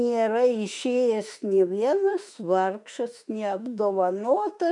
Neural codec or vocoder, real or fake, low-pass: autoencoder, 48 kHz, 32 numbers a frame, DAC-VAE, trained on Japanese speech; fake; 10.8 kHz